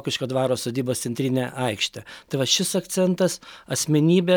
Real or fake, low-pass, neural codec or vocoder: real; 19.8 kHz; none